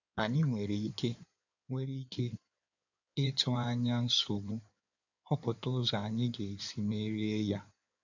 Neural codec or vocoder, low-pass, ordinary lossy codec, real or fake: codec, 16 kHz in and 24 kHz out, 2.2 kbps, FireRedTTS-2 codec; 7.2 kHz; none; fake